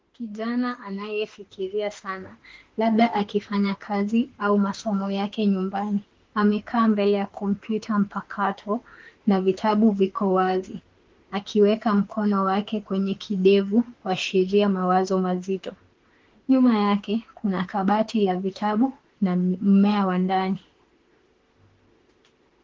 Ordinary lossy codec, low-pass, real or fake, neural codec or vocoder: Opus, 16 kbps; 7.2 kHz; fake; autoencoder, 48 kHz, 32 numbers a frame, DAC-VAE, trained on Japanese speech